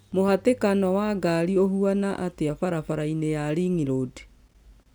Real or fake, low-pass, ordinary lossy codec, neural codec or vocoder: real; none; none; none